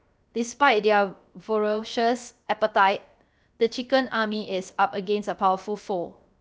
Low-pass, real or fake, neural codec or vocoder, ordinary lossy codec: none; fake; codec, 16 kHz, 0.7 kbps, FocalCodec; none